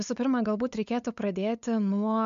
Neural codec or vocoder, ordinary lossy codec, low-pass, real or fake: codec, 16 kHz, 8 kbps, FunCodec, trained on LibriTTS, 25 frames a second; MP3, 64 kbps; 7.2 kHz; fake